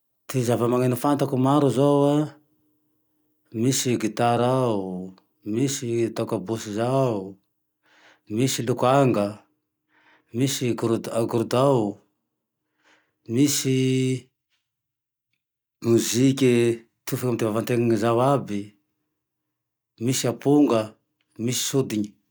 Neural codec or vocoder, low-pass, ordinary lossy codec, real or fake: none; none; none; real